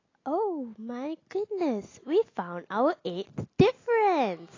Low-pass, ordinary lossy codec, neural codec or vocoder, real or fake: 7.2 kHz; AAC, 32 kbps; none; real